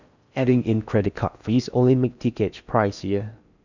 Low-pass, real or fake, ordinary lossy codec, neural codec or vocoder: 7.2 kHz; fake; none; codec, 16 kHz in and 24 kHz out, 0.6 kbps, FocalCodec, streaming, 4096 codes